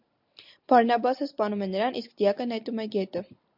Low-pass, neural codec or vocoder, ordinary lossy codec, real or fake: 5.4 kHz; none; MP3, 48 kbps; real